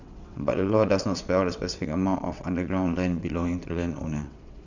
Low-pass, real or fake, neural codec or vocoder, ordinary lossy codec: 7.2 kHz; fake; vocoder, 22.05 kHz, 80 mel bands, WaveNeXt; none